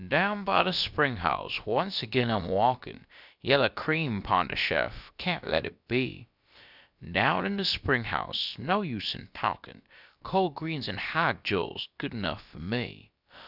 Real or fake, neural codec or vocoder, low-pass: fake; codec, 16 kHz, about 1 kbps, DyCAST, with the encoder's durations; 5.4 kHz